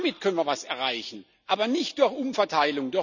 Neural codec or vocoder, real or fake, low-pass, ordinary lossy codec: none; real; 7.2 kHz; none